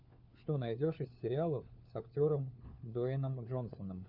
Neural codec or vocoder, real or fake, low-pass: codec, 16 kHz, 4 kbps, FunCodec, trained on LibriTTS, 50 frames a second; fake; 5.4 kHz